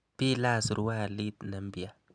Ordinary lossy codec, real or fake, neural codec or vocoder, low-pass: none; real; none; 9.9 kHz